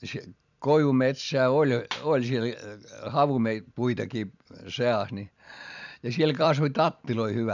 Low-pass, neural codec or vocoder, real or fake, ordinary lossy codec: 7.2 kHz; vocoder, 44.1 kHz, 128 mel bands every 256 samples, BigVGAN v2; fake; none